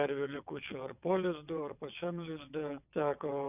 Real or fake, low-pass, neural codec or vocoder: fake; 3.6 kHz; vocoder, 22.05 kHz, 80 mel bands, WaveNeXt